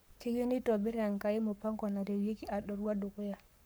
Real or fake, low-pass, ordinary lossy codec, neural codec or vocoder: fake; none; none; codec, 44.1 kHz, 7.8 kbps, Pupu-Codec